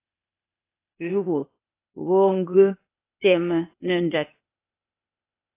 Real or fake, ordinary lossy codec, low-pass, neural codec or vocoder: fake; AAC, 24 kbps; 3.6 kHz; codec, 16 kHz, 0.8 kbps, ZipCodec